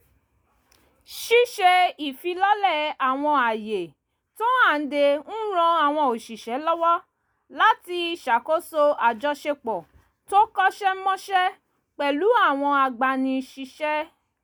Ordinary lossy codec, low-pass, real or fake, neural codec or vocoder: none; none; real; none